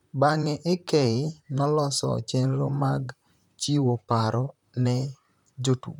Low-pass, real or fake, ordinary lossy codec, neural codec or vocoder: 19.8 kHz; fake; none; vocoder, 44.1 kHz, 128 mel bands, Pupu-Vocoder